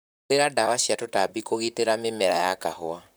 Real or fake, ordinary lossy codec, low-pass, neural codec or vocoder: fake; none; none; vocoder, 44.1 kHz, 128 mel bands, Pupu-Vocoder